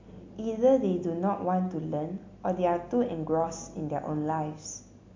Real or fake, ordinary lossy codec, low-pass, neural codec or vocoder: real; MP3, 48 kbps; 7.2 kHz; none